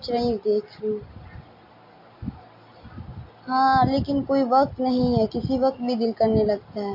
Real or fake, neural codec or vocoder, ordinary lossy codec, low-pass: real; none; MP3, 32 kbps; 5.4 kHz